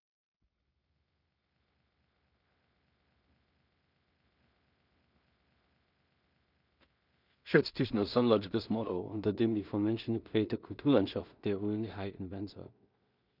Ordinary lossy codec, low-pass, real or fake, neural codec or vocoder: none; 5.4 kHz; fake; codec, 16 kHz in and 24 kHz out, 0.4 kbps, LongCat-Audio-Codec, two codebook decoder